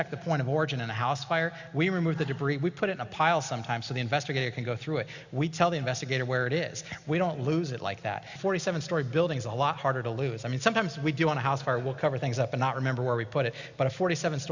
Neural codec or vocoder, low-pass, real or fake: none; 7.2 kHz; real